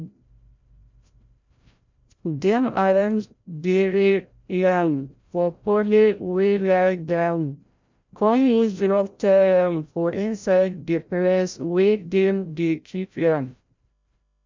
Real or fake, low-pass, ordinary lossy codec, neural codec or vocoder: fake; 7.2 kHz; MP3, 64 kbps; codec, 16 kHz, 0.5 kbps, FreqCodec, larger model